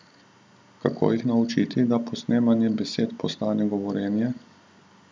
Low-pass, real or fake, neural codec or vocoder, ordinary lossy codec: none; real; none; none